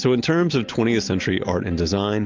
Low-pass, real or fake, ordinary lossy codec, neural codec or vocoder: 7.2 kHz; real; Opus, 24 kbps; none